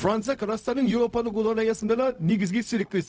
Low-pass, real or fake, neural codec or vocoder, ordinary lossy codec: none; fake; codec, 16 kHz, 0.4 kbps, LongCat-Audio-Codec; none